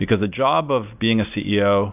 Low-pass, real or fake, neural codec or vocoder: 3.6 kHz; real; none